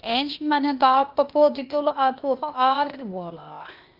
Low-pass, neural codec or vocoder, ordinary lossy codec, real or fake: 5.4 kHz; codec, 16 kHz, 0.8 kbps, ZipCodec; Opus, 32 kbps; fake